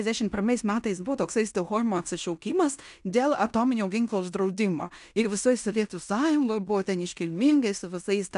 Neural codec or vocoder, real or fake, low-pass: codec, 16 kHz in and 24 kHz out, 0.9 kbps, LongCat-Audio-Codec, fine tuned four codebook decoder; fake; 10.8 kHz